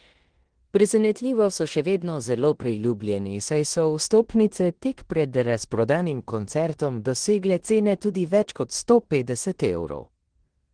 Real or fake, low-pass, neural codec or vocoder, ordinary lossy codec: fake; 9.9 kHz; codec, 16 kHz in and 24 kHz out, 0.9 kbps, LongCat-Audio-Codec, four codebook decoder; Opus, 16 kbps